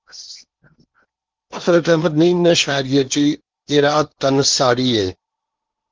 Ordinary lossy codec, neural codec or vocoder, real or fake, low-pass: Opus, 32 kbps; codec, 16 kHz in and 24 kHz out, 0.8 kbps, FocalCodec, streaming, 65536 codes; fake; 7.2 kHz